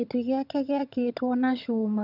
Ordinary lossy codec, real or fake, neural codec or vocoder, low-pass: none; fake; vocoder, 22.05 kHz, 80 mel bands, HiFi-GAN; 5.4 kHz